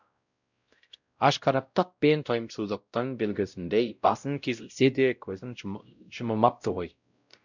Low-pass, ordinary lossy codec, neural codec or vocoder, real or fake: 7.2 kHz; none; codec, 16 kHz, 0.5 kbps, X-Codec, WavLM features, trained on Multilingual LibriSpeech; fake